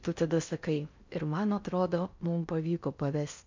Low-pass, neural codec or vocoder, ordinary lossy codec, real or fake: 7.2 kHz; codec, 16 kHz in and 24 kHz out, 0.6 kbps, FocalCodec, streaming, 4096 codes; MP3, 64 kbps; fake